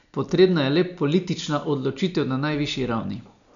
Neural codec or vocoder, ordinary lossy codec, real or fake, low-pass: none; none; real; 7.2 kHz